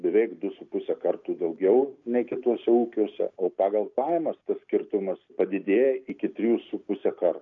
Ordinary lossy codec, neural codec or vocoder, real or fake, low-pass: MP3, 48 kbps; none; real; 7.2 kHz